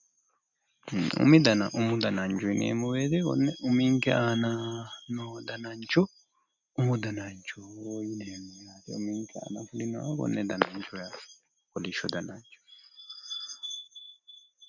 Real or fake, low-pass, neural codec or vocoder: real; 7.2 kHz; none